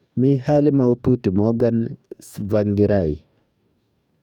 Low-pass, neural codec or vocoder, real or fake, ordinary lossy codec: 19.8 kHz; codec, 44.1 kHz, 2.6 kbps, DAC; fake; none